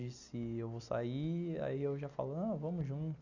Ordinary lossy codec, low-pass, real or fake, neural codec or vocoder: none; 7.2 kHz; real; none